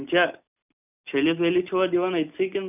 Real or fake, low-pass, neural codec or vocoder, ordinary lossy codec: real; 3.6 kHz; none; none